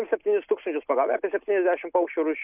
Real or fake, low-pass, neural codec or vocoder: real; 3.6 kHz; none